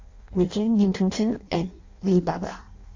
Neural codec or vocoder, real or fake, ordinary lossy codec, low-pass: codec, 16 kHz in and 24 kHz out, 0.6 kbps, FireRedTTS-2 codec; fake; AAC, 48 kbps; 7.2 kHz